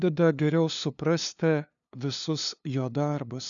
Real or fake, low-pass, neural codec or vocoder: fake; 7.2 kHz; codec, 16 kHz, 2 kbps, FunCodec, trained on LibriTTS, 25 frames a second